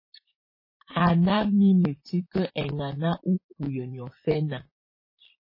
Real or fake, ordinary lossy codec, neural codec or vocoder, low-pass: fake; MP3, 24 kbps; vocoder, 44.1 kHz, 128 mel bands, Pupu-Vocoder; 5.4 kHz